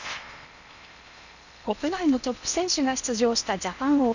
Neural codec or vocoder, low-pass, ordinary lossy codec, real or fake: codec, 16 kHz in and 24 kHz out, 0.8 kbps, FocalCodec, streaming, 65536 codes; 7.2 kHz; none; fake